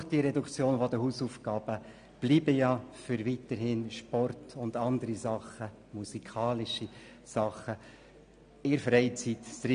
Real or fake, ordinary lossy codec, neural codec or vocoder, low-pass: real; MP3, 64 kbps; none; 9.9 kHz